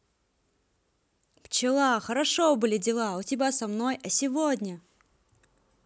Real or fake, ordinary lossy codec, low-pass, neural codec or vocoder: real; none; none; none